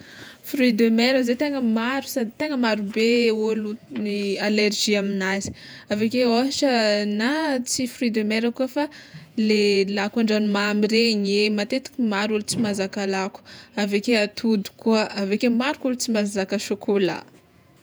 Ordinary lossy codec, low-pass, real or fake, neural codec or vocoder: none; none; fake; vocoder, 48 kHz, 128 mel bands, Vocos